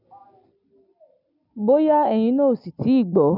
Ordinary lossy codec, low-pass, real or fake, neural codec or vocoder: none; 5.4 kHz; real; none